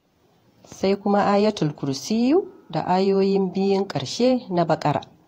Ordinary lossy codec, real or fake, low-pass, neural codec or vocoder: AAC, 48 kbps; fake; 19.8 kHz; vocoder, 48 kHz, 128 mel bands, Vocos